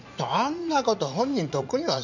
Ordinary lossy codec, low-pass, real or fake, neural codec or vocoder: none; 7.2 kHz; fake; vocoder, 44.1 kHz, 80 mel bands, Vocos